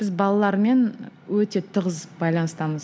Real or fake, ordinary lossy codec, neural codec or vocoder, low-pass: real; none; none; none